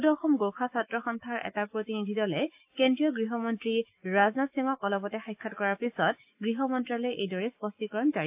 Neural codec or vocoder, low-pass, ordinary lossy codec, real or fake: autoencoder, 48 kHz, 128 numbers a frame, DAC-VAE, trained on Japanese speech; 3.6 kHz; AAC, 32 kbps; fake